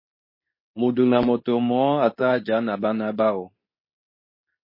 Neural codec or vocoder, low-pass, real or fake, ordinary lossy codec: codec, 24 kHz, 0.9 kbps, WavTokenizer, medium speech release version 2; 5.4 kHz; fake; MP3, 24 kbps